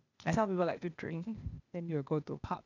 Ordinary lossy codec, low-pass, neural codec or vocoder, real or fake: none; 7.2 kHz; codec, 16 kHz, 0.8 kbps, ZipCodec; fake